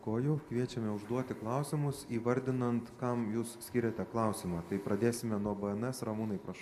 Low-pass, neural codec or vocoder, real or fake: 14.4 kHz; none; real